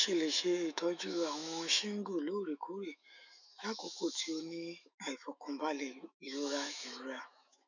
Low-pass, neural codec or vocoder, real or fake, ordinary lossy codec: 7.2 kHz; autoencoder, 48 kHz, 128 numbers a frame, DAC-VAE, trained on Japanese speech; fake; none